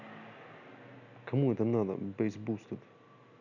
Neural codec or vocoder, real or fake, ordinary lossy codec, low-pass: none; real; none; 7.2 kHz